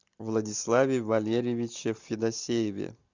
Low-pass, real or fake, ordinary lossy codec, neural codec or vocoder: 7.2 kHz; real; Opus, 64 kbps; none